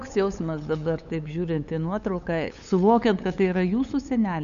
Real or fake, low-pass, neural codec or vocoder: fake; 7.2 kHz; codec, 16 kHz, 8 kbps, FunCodec, trained on LibriTTS, 25 frames a second